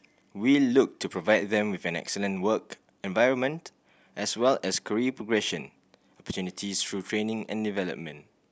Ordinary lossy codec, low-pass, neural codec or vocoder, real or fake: none; none; none; real